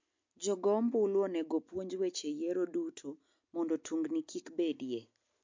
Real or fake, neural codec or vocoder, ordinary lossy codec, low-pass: real; none; MP3, 48 kbps; 7.2 kHz